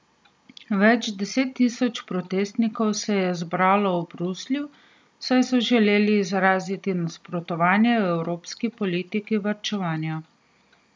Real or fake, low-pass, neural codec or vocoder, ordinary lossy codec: real; none; none; none